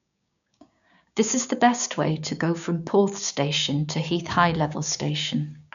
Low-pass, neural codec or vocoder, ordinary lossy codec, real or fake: 7.2 kHz; codec, 16 kHz, 6 kbps, DAC; none; fake